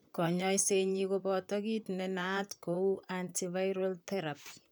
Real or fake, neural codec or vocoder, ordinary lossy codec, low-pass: fake; vocoder, 44.1 kHz, 128 mel bands, Pupu-Vocoder; none; none